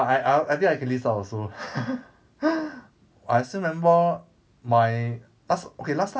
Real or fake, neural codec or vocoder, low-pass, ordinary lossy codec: real; none; none; none